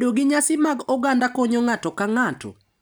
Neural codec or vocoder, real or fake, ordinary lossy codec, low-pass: none; real; none; none